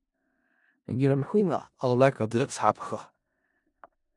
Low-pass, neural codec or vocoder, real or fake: 10.8 kHz; codec, 16 kHz in and 24 kHz out, 0.4 kbps, LongCat-Audio-Codec, four codebook decoder; fake